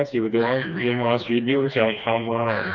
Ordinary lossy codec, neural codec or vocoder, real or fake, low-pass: none; codec, 16 kHz, 2 kbps, FreqCodec, smaller model; fake; 7.2 kHz